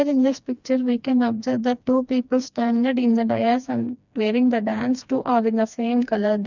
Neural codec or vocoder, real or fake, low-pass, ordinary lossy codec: codec, 16 kHz, 2 kbps, FreqCodec, smaller model; fake; 7.2 kHz; none